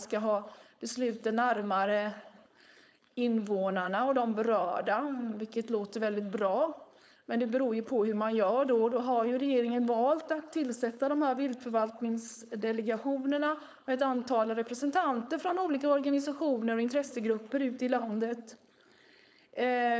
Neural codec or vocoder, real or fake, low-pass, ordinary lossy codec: codec, 16 kHz, 4.8 kbps, FACodec; fake; none; none